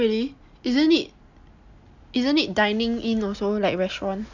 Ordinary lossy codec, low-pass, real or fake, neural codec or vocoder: none; 7.2 kHz; real; none